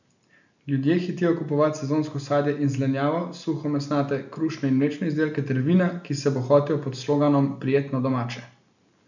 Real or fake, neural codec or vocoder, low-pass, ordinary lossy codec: real; none; 7.2 kHz; none